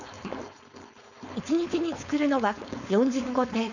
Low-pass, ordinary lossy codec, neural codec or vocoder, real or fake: 7.2 kHz; none; codec, 16 kHz, 4.8 kbps, FACodec; fake